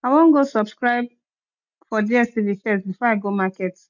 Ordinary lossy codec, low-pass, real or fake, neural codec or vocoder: none; 7.2 kHz; real; none